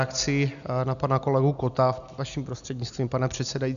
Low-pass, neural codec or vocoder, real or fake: 7.2 kHz; none; real